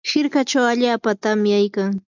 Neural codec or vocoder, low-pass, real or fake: none; 7.2 kHz; real